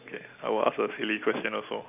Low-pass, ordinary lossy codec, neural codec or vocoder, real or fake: 3.6 kHz; none; none; real